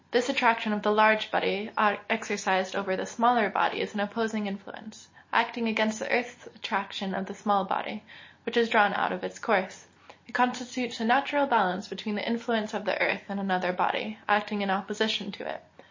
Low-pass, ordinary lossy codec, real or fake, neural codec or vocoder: 7.2 kHz; MP3, 32 kbps; real; none